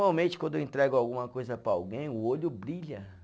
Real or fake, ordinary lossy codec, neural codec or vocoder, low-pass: real; none; none; none